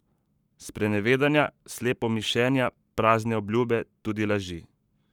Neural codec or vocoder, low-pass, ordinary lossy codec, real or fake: codec, 44.1 kHz, 7.8 kbps, Pupu-Codec; 19.8 kHz; none; fake